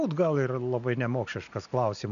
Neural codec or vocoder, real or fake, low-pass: none; real; 7.2 kHz